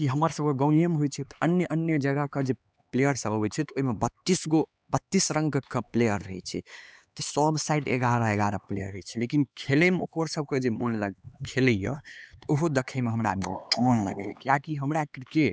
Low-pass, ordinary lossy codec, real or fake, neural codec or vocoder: none; none; fake; codec, 16 kHz, 2 kbps, X-Codec, HuBERT features, trained on LibriSpeech